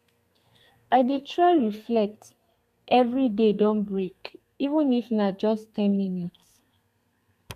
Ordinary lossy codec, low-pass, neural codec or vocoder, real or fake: none; 14.4 kHz; codec, 32 kHz, 1.9 kbps, SNAC; fake